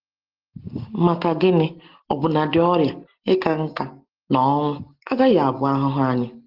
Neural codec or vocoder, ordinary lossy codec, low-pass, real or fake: none; Opus, 16 kbps; 5.4 kHz; real